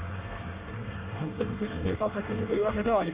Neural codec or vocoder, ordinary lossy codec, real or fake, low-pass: codec, 24 kHz, 1 kbps, SNAC; Opus, 64 kbps; fake; 3.6 kHz